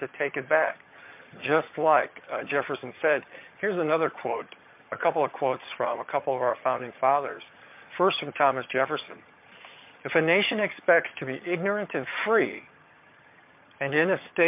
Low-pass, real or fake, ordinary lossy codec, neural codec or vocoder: 3.6 kHz; fake; MP3, 32 kbps; vocoder, 22.05 kHz, 80 mel bands, HiFi-GAN